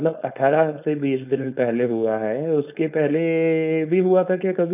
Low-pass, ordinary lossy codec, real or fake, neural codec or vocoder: 3.6 kHz; none; fake; codec, 16 kHz, 4.8 kbps, FACodec